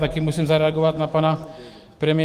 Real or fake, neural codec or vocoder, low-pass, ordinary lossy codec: fake; autoencoder, 48 kHz, 128 numbers a frame, DAC-VAE, trained on Japanese speech; 14.4 kHz; Opus, 24 kbps